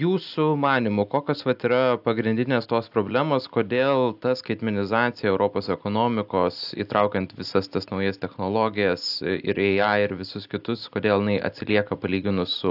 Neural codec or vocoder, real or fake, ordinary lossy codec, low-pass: none; real; AAC, 48 kbps; 5.4 kHz